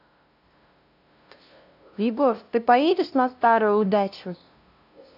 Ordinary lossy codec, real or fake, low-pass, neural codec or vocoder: AAC, 48 kbps; fake; 5.4 kHz; codec, 16 kHz, 0.5 kbps, FunCodec, trained on LibriTTS, 25 frames a second